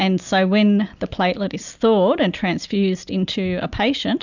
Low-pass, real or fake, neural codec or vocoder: 7.2 kHz; real; none